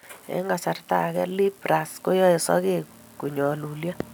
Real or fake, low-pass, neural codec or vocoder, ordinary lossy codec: real; none; none; none